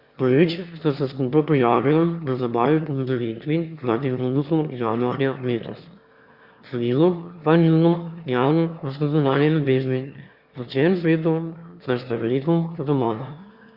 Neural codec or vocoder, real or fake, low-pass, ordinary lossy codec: autoencoder, 22.05 kHz, a latent of 192 numbers a frame, VITS, trained on one speaker; fake; 5.4 kHz; Opus, 64 kbps